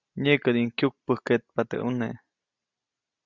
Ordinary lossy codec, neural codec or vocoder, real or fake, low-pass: Opus, 64 kbps; none; real; 7.2 kHz